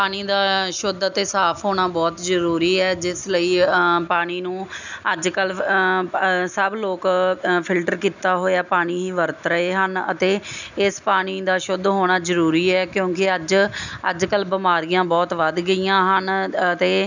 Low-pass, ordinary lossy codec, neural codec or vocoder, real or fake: 7.2 kHz; none; none; real